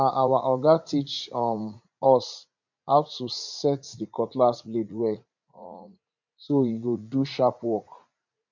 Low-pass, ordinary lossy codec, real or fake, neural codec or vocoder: 7.2 kHz; none; fake; vocoder, 44.1 kHz, 80 mel bands, Vocos